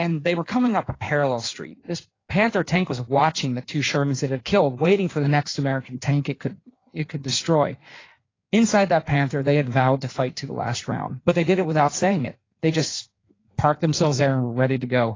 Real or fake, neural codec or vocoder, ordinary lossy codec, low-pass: fake; codec, 16 kHz in and 24 kHz out, 1.1 kbps, FireRedTTS-2 codec; AAC, 32 kbps; 7.2 kHz